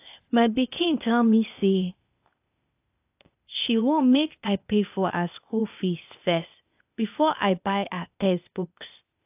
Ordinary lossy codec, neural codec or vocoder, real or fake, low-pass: AAC, 32 kbps; codec, 16 kHz, 0.8 kbps, ZipCodec; fake; 3.6 kHz